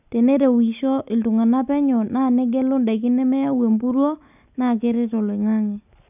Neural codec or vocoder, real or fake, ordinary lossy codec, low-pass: none; real; none; 3.6 kHz